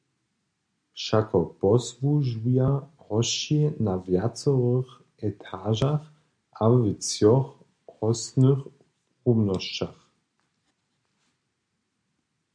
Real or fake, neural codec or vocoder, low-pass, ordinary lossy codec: real; none; 9.9 kHz; MP3, 96 kbps